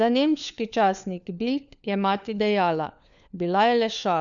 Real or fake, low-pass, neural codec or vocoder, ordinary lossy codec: fake; 7.2 kHz; codec, 16 kHz, 4 kbps, FunCodec, trained on LibriTTS, 50 frames a second; none